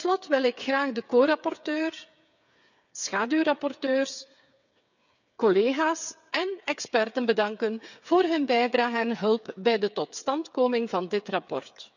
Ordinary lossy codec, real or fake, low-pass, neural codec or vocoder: none; fake; 7.2 kHz; codec, 16 kHz, 8 kbps, FreqCodec, smaller model